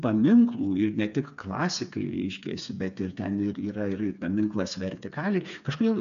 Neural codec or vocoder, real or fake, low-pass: codec, 16 kHz, 4 kbps, FreqCodec, smaller model; fake; 7.2 kHz